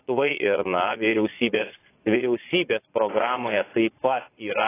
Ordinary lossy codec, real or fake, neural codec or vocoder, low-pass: AAC, 16 kbps; fake; vocoder, 22.05 kHz, 80 mel bands, Vocos; 3.6 kHz